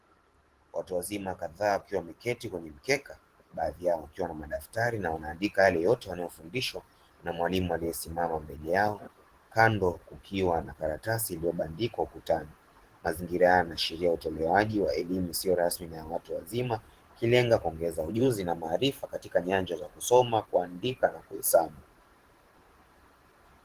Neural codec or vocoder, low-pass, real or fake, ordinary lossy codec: vocoder, 44.1 kHz, 128 mel bands every 512 samples, BigVGAN v2; 14.4 kHz; fake; Opus, 16 kbps